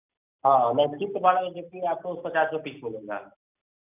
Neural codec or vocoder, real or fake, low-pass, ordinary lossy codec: none; real; 3.6 kHz; none